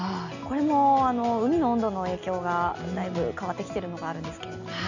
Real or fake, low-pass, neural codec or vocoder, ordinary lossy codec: real; 7.2 kHz; none; none